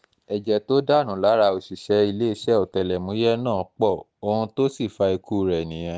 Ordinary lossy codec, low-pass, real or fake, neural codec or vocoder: none; none; real; none